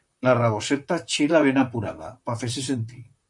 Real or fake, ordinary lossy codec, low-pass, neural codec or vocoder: fake; MP3, 64 kbps; 10.8 kHz; vocoder, 44.1 kHz, 128 mel bands, Pupu-Vocoder